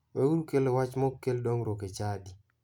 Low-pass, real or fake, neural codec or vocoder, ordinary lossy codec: 19.8 kHz; fake; vocoder, 44.1 kHz, 128 mel bands every 256 samples, BigVGAN v2; none